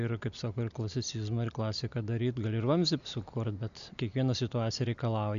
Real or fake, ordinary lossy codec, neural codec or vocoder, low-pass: real; AAC, 96 kbps; none; 7.2 kHz